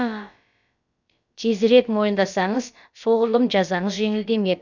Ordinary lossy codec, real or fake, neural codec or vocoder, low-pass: none; fake; codec, 16 kHz, about 1 kbps, DyCAST, with the encoder's durations; 7.2 kHz